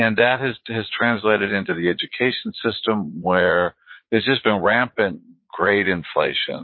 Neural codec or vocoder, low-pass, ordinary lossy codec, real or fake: vocoder, 22.05 kHz, 80 mel bands, Vocos; 7.2 kHz; MP3, 24 kbps; fake